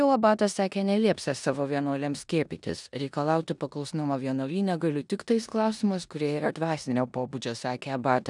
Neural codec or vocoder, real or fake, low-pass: codec, 16 kHz in and 24 kHz out, 0.9 kbps, LongCat-Audio-Codec, four codebook decoder; fake; 10.8 kHz